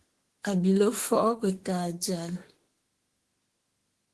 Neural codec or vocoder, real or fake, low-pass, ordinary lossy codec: autoencoder, 48 kHz, 32 numbers a frame, DAC-VAE, trained on Japanese speech; fake; 10.8 kHz; Opus, 16 kbps